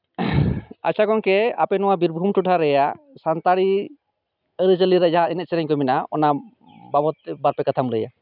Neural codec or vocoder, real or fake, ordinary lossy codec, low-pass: none; real; none; 5.4 kHz